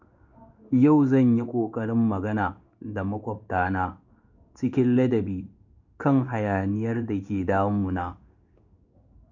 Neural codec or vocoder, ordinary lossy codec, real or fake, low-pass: codec, 16 kHz in and 24 kHz out, 1 kbps, XY-Tokenizer; none; fake; 7.2 kHz